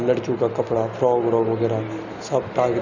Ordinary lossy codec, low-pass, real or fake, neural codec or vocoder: none; 7.2 kHz; real; none